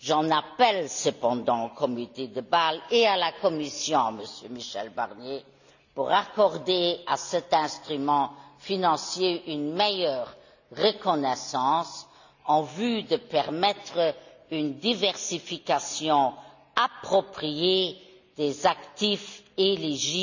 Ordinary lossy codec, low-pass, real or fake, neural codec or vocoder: none; 7.2 kHz; real; none